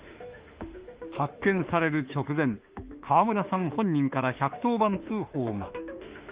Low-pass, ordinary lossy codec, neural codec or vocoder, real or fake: 3.6 kHz; Opus, 32 kbps; autoencoder, 48 kHz, 32 numbers a frame, DAC-VAE, trained on Japanese speech; fake